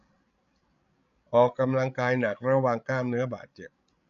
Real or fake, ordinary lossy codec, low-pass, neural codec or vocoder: fake; none; 7.2 kHz; codec, 16 kHz, 16 kbps, FreqCodec, larger model